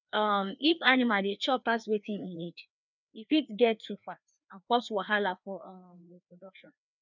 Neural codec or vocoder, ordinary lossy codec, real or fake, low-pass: codec, 16 kHz, 2 kbps, FreqCodec, larger model; none; fake; 7.2 kHz